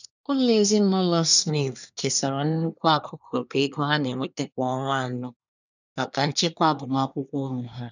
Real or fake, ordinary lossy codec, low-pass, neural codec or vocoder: fake; none; 7.2 kHz; codec, 24 kHz, 1 kbps, SNAC